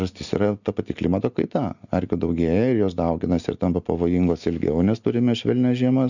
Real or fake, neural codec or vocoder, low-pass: real; none; 7.2 kHz